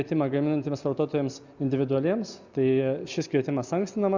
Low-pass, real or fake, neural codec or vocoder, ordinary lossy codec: 7.2 kHz; real; none; Opus, 64 kbps